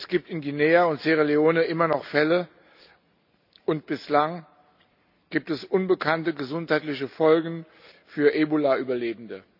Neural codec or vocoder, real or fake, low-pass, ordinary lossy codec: none; real; 5.4 kHz; none